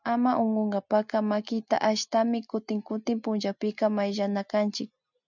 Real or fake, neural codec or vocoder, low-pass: real; none; 7.2 kHz